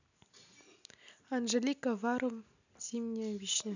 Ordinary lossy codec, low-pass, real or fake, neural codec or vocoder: none; 7.2 kHz; real; none